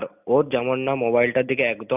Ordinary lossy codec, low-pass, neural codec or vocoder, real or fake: none; 3.6 kHz; none; real